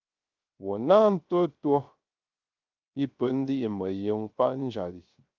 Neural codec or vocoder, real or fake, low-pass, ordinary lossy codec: codec, 16 kHz, 0.3 kbps, FocalCodec; fake; 7.2 kHz; Opus, 32 kbps